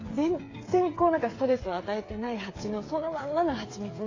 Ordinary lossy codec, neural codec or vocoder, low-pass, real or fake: AAC, 32 kbps; codec, 16 kHz, 8 kbps, FreqCodec, smaller model; 7.2 kHz; fake